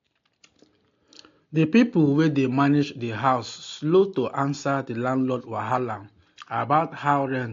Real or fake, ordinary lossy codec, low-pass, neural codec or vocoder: real; AAC, 48 kbps; 7.2 kHz; none